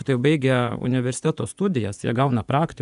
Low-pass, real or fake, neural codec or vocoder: 10.8 kHz; fake; vocoder, 24 kHz, 100 mel bands, Vocos